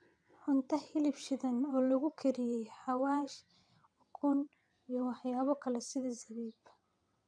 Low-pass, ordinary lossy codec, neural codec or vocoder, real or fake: 9.9 kHz; none; vocoder, 22.05 kHz, 80 mel bands, WaveNeXt; fake